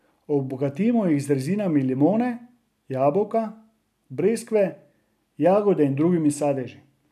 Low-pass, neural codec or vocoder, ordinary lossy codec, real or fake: 14.4 kHz; none; none; real